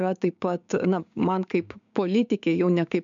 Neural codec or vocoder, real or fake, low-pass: codec, 16 kHz, 6 kbps, DAC; fake; 7.2 kHz